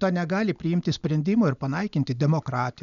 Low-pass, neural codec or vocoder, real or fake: 7.2 kHz; none; real